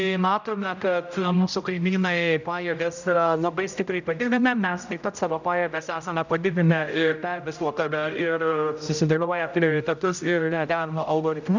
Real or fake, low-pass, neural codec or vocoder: fake; 7.2 kHz; codec, 16 kHz, 0.5 kbps, X-Codec, HuBERT features, trained on general audio